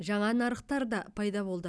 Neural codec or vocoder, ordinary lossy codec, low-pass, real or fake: none; none; none; real